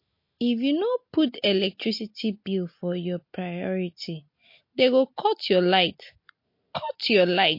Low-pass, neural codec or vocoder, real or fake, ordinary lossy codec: 5.4 kHz; none; real; MP3, 32 kbps